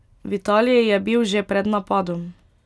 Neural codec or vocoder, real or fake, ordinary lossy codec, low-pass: none; real; none; none